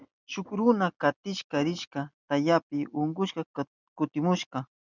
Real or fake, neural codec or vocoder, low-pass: real; none; 7.2 kHz